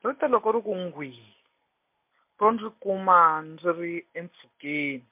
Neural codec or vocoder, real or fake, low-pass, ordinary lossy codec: none; real; 3.6 kHz; MP3, 32 kbps